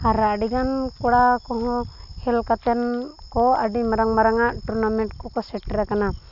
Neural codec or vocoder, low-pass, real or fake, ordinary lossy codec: none; 5.4 kHz; real; none